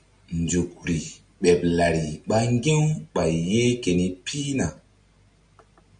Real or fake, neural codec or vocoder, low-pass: real; none; 9.9 kHz